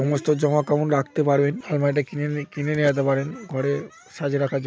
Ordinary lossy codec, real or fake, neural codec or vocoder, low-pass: none; real; none; none